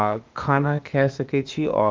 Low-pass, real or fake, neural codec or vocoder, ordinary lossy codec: 7.2 kHz; fake; codec, 16 kHz, 0.8 kbps, ZipCodec; Opus, 32 kbps